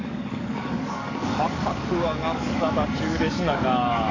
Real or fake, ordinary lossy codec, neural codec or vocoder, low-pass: fake; none; codec, 44.1 kHz, 7.8 kbps, DAC; 7.2 kHz